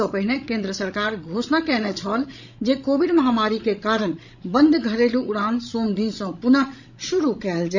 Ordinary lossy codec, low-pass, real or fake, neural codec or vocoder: none; 7.2 kHz; fake; codec, 16 kHz, 16 kbps, FreqCodec, larger model